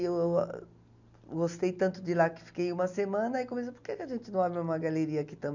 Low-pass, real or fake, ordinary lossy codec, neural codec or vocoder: 7.2 kHz; real; none; none